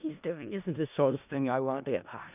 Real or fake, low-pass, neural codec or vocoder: fake; 3.6 kHz; codec, 16 kHz in and 24 kHz out, 0.4 kbps, LongCat-Audio-Codec, four codebook decoder